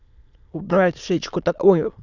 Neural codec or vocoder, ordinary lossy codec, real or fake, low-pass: autoencoder, 22.05 kHz, a latent of 192 numbers a frame, VITS, trained on many speakers; none; fake; 7.2 kHz